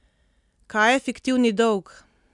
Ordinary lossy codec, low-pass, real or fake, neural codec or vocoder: none; 10.8 kHz; real; none